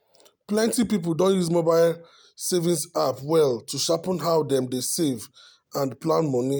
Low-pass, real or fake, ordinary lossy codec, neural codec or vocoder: none; real; none; none